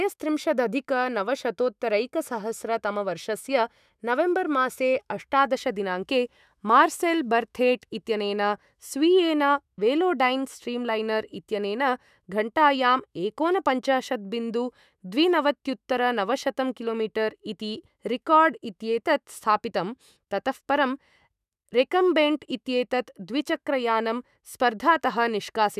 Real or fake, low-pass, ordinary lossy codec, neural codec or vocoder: fake; 14.4 kHz; none; autoencoder, 48 kHz, 128 numbers a frame, DAC-VAE, trained on Japanese speech